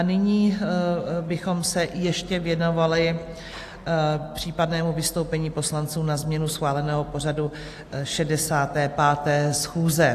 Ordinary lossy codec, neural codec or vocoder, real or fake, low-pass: AAC, 64 kbps; none; real; 14.4 kHz